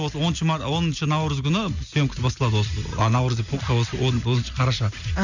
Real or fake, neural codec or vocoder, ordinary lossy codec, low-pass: real; none; none; 7.2 kHz